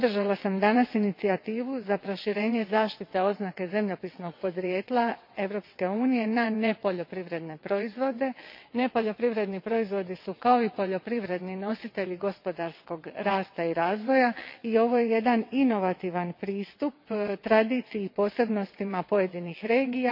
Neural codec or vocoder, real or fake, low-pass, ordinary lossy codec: vocoder, 22.05 kHz, 80 mel bands, WaveNeXt; fake; 5.4 kHz; MP3, 32 kbps